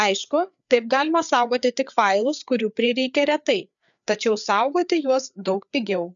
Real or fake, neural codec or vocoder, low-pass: fake; codec, 16 kHz, 4 kbps, FreqCodec, larger model; 7.2 kHz